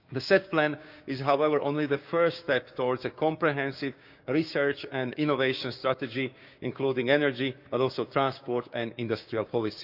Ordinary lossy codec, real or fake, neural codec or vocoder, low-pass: none; fake; codec, 16 kHz, 6 kbps, DAC; 5.4 kHz